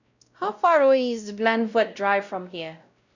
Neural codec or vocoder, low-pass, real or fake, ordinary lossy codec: codec, 16 kHz, 0.5 kbps, X-Codec, WavLM features, trained on Multilingual LibriSpeech; 7.2 kHz; fake; none